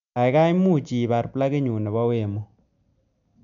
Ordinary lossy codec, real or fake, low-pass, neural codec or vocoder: none; real; 7.2 kHz; none